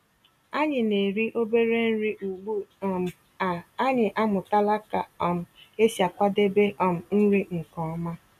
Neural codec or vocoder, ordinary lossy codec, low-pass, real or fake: none; none; 14.4 kHz; real